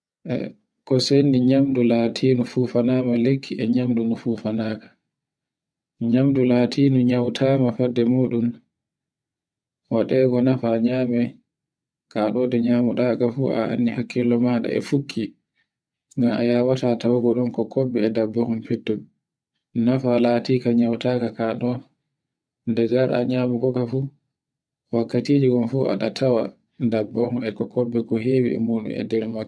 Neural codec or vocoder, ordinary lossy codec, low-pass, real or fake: vocoder, 22.05 kHz, 80 mel bands, WaveNeXt; none; none; fake